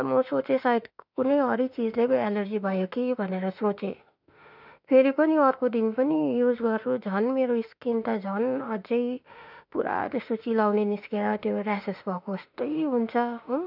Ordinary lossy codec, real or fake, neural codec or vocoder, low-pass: none; fake; autoencoder, 48 kHz, 32 numbers a frame, DAC-VAE, trained on Japanese speech; 5.4 kHz